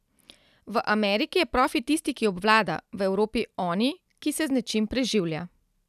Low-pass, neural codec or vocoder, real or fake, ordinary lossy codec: 14.4 kHz; none; real; none